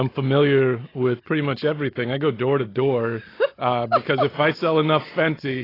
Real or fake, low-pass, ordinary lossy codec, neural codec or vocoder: real; 5.4 kHz; AAC, 24 kbps; none